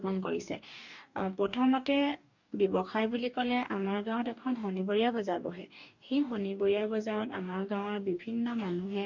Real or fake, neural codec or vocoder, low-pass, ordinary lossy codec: fake; codec, 44.1 kHz, 2.6 kbps, DAC; 7.2 kHz; none